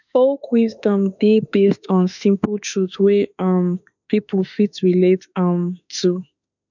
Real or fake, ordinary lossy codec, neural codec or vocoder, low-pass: fake; none; autoencoder, 48 kHz, 32 numbers a frame, DAC-VAE, trained on Japanese speech; 7.2 kHz